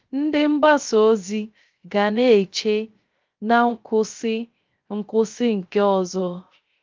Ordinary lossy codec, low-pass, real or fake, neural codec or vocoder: Opus, 32 kbps; 7.2 kHz; fake; codec, 16 kHz, 0.3 kbps, FocalCodec